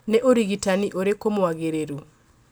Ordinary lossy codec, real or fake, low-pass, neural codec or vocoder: none; real; none; none